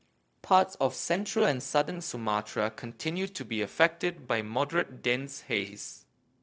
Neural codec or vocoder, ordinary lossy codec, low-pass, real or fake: codec, 16 kHz, 0.4 kbps, LongCat-Audio-Codec; none; none; fake